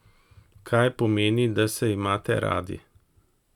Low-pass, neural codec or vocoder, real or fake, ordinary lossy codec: 19.8 kHz; none; real; none